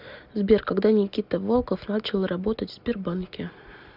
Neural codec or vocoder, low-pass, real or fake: none; 5.4 kHz; real